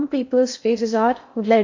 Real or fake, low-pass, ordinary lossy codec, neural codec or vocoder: fake; 7.2 kHz; AAC, 48 kbps; codec, 16 kHz in and 24 kHz out, 0.6 kbps, FocalCodec, streaming, 2048 codes